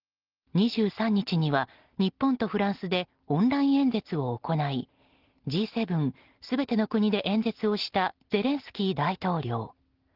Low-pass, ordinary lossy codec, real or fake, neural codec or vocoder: 5.4 kHz; Opus, 16 kbps; real; none